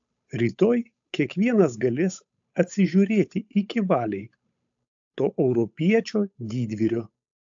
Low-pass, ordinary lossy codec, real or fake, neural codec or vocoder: 7.2 kHz; AAC, 64 kbps; fake; codec, 16 kHz, 8 kbps, FunCodec, trained on Chinese and English, 25 frames a second